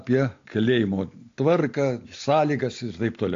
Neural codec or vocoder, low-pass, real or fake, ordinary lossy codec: none; 7.2 kHz; real; AAC, 48 kbps